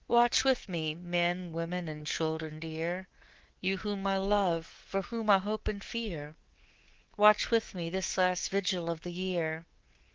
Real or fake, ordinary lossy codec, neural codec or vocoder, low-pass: fake; Opus, 16 kbps; codec, 24 kHz, 3.1 kbps, DualCodec; 7.2 kHz